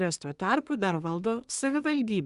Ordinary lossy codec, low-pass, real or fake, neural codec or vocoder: Opus, 64 kbps; 10.8 kHz; fake; codec, 24 kHz, 1 kbps, SNAC